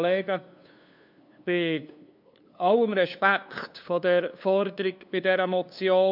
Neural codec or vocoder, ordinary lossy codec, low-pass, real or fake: codec, 16 kHz, 2 kbps, FunCodec, trained on LibriTTS, 25 frames a second; none; 5.4 kHz; fake